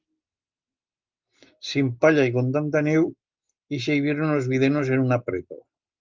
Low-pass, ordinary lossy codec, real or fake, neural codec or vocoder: 7.2 kHz; Opus, 32 kbps; real; none